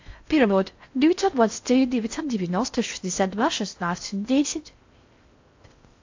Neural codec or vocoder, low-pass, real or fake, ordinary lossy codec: codec, 16 kHz in and 24 kHz out, 0.6 kbps, FocalCodec, streaming, 4096 codes; 7.2 kHz; fake; AAC, 48 kbps